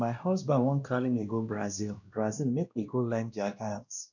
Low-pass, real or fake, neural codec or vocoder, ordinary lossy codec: 7.2 kHz; fake; codec, 16 kHz, 1 kbps, X-Codec, WavLM features, trained on Multilingual LibriSpeech; none